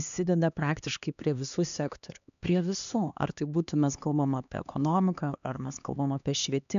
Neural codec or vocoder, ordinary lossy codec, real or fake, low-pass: codec, 16 kHz, 2 kbps, X-Codec, HuBERT features, trained on LibriSpeech; AAC, 96 kbps; fake; 7.2 kHz